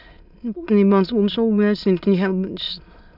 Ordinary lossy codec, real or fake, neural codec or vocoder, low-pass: none; fake; autoencoder, 22.05 kHz, a latent of 192 numbers a frame, VITS, trained on many speakers; 5.4 kHz